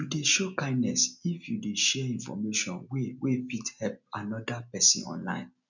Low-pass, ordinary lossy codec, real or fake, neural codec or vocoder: 7.2 kHz; none; real; none